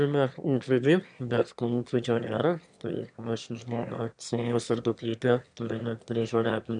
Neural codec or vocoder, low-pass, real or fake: autoencoder, 22.05 kHz, a latent of 192 numbers a frame, VITS, trained on one speaker; 9.9 kHz; fake